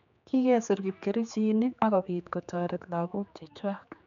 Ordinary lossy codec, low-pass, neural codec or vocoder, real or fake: none; 7.2 kHz; codec, 16 kHz, 4 kbps, X-Codec, HuBERT features, trained on general audio; fake